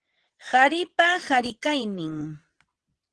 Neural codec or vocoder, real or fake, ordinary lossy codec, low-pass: vocoder, 22.05 kHz, 80 mel bands, WaveNeXt; fake; Opus, 16 kbps; 9.9 kHz